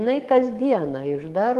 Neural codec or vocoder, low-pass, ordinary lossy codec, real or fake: codec, 44.1 kHz, 7.8 kbps, DAC; 14.4 kHz; Opus, 24 kbps; fake